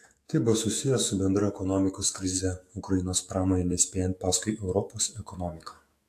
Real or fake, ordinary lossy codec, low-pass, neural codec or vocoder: fake; AAC, 64 kbps; 14.4 kHz; autoencoder, 48 kHz, 128 numbers a frame, DAC-VAE, trained on Japanese speech